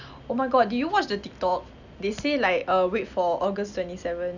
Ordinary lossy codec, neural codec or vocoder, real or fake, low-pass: none; none; real; 7.2 kHz